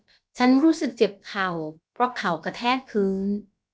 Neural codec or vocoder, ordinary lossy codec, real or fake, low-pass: codec, 16 kHz, about 1 kbps, DyCAST, with the encoder's durations; none; fake; none